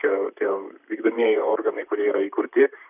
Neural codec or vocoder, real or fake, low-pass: vocoder, 44.1 kHz, 128 mel bands, Pupu-Vocoder; fake; 3.6 kHz